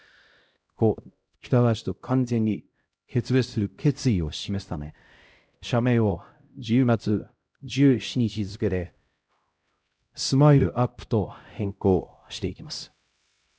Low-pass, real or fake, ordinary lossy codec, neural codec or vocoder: none; fake; none; codec, 16 kHz, 0.5 kbps, X-Codec, HuBERT features, trained on LibriSpeech